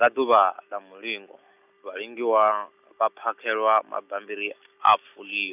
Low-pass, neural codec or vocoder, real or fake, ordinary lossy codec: 3.6 kHz; none; real; none